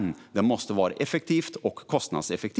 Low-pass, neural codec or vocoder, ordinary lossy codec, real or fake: none; none; none; real